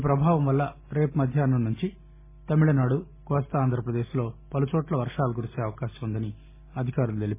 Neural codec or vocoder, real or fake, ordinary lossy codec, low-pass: none; real; MP3, 16 kbps; 3.6 kHz